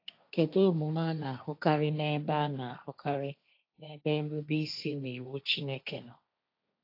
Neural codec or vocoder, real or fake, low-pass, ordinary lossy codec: codec, 16 kHz, 1.1 kbps, Voila-Tokenizer; fake; 5.4 kHz; AAC, 32 kbps